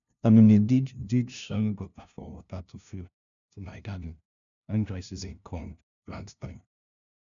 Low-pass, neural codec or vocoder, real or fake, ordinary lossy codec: 7.2 kHz; codec, 16 kHz, 0.5 kbps, FunCodec, trained on LibriTTS, 25 frames a second; fake; none